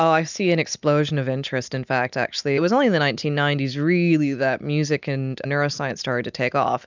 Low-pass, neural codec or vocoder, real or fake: 7.2 kHz; none; real